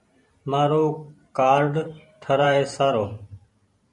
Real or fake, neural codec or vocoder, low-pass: fake; vocoder, 44.1 kHz, 128 mel bands every 512 samples, BigVGAN v2; 10.8 kHz